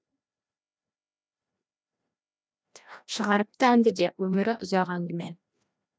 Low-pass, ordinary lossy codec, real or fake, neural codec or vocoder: none; none; fake; codec, 16 kHz, 1 kbps, FreqCodec, larger model